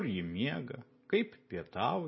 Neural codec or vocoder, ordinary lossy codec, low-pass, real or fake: none; MP3, 24 kbps; 7.2 kHz; real